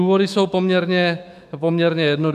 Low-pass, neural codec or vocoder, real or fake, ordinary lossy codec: 14.4 kHz; autoencoder, 48 kHz, 128 numbers a frame, DAC-VAE, trained on Japanese speech; fake; AAC, 96 kbps